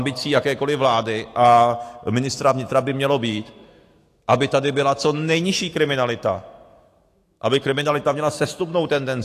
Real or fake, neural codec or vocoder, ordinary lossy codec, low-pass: fake; autoencoder, 48 kHz, 128 numbers a frame, DAC-VAE, trained on Japanese speech; AAC, 48 kbps; 14.4 kHz